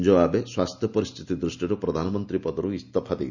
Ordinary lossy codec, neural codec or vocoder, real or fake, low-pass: none; none; real; 7.2 kHz